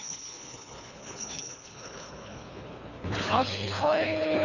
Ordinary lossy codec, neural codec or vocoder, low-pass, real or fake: none; codec, 24 kHz, 3 kbps, HILCodec; 7.2 kHz; fake